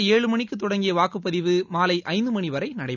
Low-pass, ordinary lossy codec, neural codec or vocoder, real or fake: 7.2 kHz; none; none; real